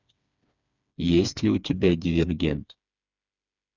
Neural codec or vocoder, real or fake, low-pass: codec, 16 kHz, 4 kbps, FreqCodec, smaller model; fake; 7.2 kHz